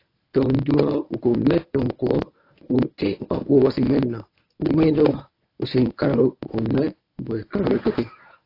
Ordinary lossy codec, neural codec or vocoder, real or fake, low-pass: AAC, 32 kbps; codec, 24 kHz, 0.9 kbps, WavTokenizer, medium speech release version 1; fake; 5.4 kHz